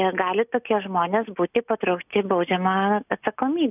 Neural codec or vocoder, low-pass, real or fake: none; 3.6 kHz; real